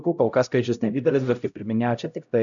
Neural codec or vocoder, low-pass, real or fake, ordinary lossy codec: codec, 16 kHz, 0.5 kbps, X-Codec, HuBERT features, trained on LibriSpeech; 7.2 kHz; fake; MP3, 96 kbps